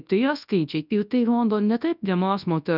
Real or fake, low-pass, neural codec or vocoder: fake; 5.4 kHz; codec, 24 kHz, 0.9 kbps, WavTokenizer, large speech release